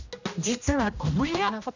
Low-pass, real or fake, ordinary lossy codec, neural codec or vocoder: 7.2 kHz; fake; none; codec, 16 kHz, 1 kbps, X-Codec, HuBERT features, trained on general audio